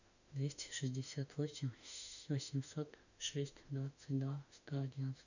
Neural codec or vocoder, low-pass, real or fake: autoencoder, 48 kHz, 32 numbers a frame, DAC-VAE, trained on Japanese speech; 7.2 kHz; fake